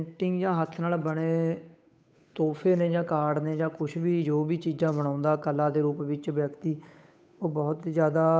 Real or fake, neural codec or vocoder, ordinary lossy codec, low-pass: fake; codec, 16 kHz, 8 kbps, FunCodec, trained on Chinese and English, 25 frames a second; none; none